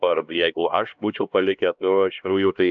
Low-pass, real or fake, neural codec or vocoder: 7.2 kHz; fake; codec, 16 kHz, 1 kbps, X-Codec, HuBERT features, trained on LibriSpeech